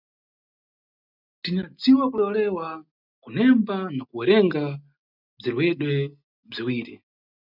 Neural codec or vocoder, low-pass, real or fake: none; 5.4 kHz; real